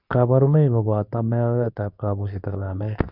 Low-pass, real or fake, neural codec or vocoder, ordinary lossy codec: 5.4 kHz; fake; codec, 24 kHz, 0.9 kbps, WavTokenizer, medium speech release version 2; none